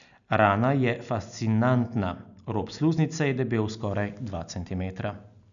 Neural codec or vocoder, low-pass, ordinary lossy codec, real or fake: none; 7.2 kHz; none; real